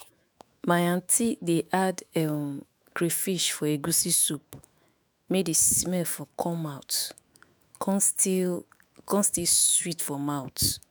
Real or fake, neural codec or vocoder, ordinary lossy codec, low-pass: fake; autoencoder, 48 kHz, 128 numbers a frame, DAC-VAE, trained on Japanese speech; none; none